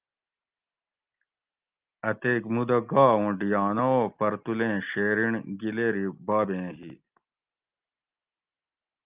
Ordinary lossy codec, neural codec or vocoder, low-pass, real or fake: Opus, 24 kbps; none; 3.6 kHz; real